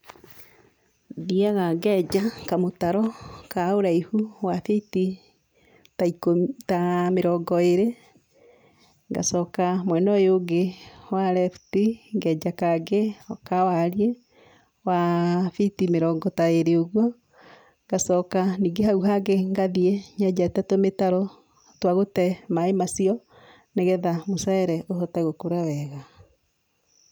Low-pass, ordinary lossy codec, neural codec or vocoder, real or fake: none; none; none; real